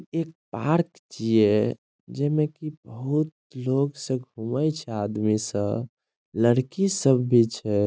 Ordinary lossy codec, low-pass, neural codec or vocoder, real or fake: none; none; none; real